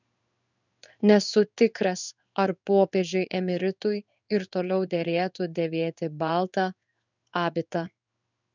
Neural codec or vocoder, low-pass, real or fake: codec, 16 kHz in and 24 kHz out, 1 kbps, XY-Tokenizer; 7.2 kHz; fake